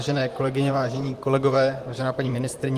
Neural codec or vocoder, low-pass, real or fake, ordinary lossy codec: vocoder, 44.1 kHz, 128 mel bands, Pupu-Vocoder; 14.4 kHz; fake; Opus, 32 kbps